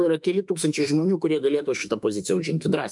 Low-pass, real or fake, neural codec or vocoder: 10.8 kHz; fake; autoencoder, 48 kHz, 32 numbers a frame, DAC-VAE, trained on Japanese speech